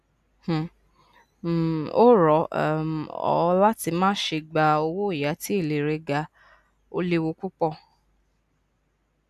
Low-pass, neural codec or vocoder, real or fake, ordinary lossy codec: 14.4 kHz; none; real; none